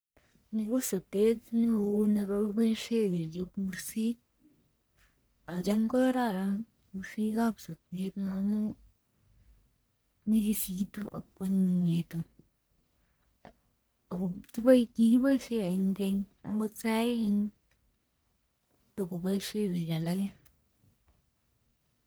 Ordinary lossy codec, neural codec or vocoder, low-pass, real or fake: none; codec, 44.1 kHz, 1.7 kbps, Pupu-Codec; none; fake